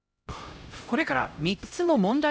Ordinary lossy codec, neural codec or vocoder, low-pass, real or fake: none; codec, 16 kHz, 0.5 kbps, X-Codec, HuBERT features, trained on LibriSpeech; none; fake